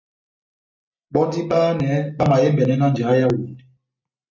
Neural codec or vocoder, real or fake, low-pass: none; real; 7.2 kHz